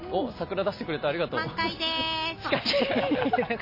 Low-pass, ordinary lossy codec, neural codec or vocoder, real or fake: 5.4 kHz; MP3, 24 kbps; none; real